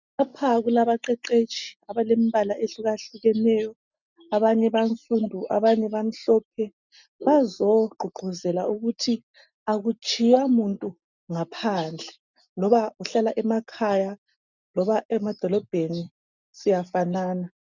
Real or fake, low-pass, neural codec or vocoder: real; 7.2 kHz; none